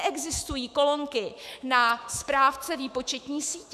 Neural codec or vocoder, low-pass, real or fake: autoencoder, 48 kHz, 128 numbers a frame, DAC-VAE, trained on Japanese speech; 14.4 kHz; fake